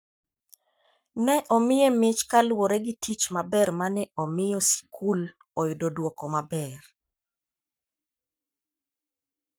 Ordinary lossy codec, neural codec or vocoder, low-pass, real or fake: none; codec, 44.1 kHz, 7.8 kbps, Pupu-Codec; none; fake